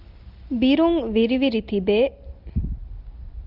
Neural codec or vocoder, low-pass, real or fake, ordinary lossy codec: none; 5.4 kHz; real; Opus, 24 kbps